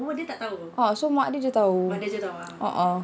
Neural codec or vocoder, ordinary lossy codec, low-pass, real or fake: none; none; none; real